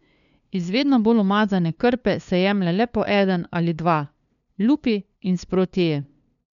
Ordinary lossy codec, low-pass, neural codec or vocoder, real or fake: none; 7.2 kHz; codec, 16 kHz, 2 kbps, FunCodec, trained on LibriTTS, 25 frames a second; fake